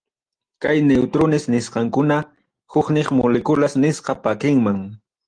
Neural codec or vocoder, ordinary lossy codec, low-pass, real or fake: none; Opus, 24 kbps; 9.9 kHz; real